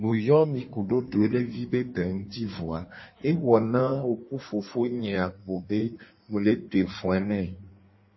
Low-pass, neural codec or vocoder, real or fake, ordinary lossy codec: 7.2 kHz; codec, 16 kHz in and 24 kHz out, 1.1 kbps, FireRedTTS-2 codec; fake; MP3, 24 kbps